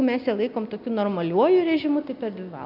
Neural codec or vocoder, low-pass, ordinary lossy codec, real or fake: none; 5.4 kHz; AAC, 48 kbps; real